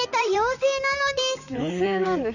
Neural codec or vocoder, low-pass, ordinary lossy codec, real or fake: vocoder, 44.1 kHz, 128 mel bands, Pupu-Vocoder; 7.2 kHz; none; fake